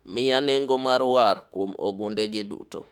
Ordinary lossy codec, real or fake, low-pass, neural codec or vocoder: none; fake; 19.8 kHz; autoencoder, 48 kHz, 32 numbers a frame, DAC-VAE, trained on Japanese speech